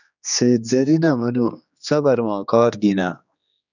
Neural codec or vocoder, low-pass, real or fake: codec, 16 kHz, 2 kbps, X-Codec, HuBERT features, trained on general audio; 7.2 kHz; fake